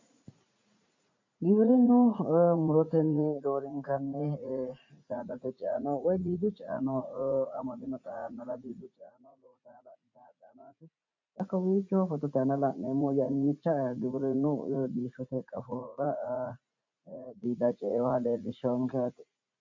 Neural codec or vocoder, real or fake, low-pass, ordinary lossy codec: vocoder, 44.1 kHz, 80 mel bands, Vocos; fake; 7.2 kHz; MP3, 48 kbps